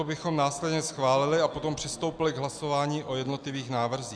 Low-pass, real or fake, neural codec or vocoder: 9.9 kHz; real; none